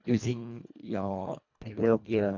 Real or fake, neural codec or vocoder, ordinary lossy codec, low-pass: fake; codec, 24 kHz, 1.5 kbps, HILCodec; none; 7.2 kHz